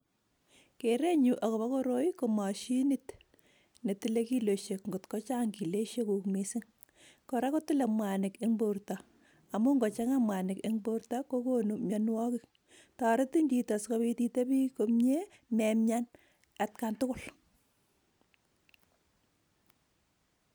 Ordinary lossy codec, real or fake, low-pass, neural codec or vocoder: none; real; none; none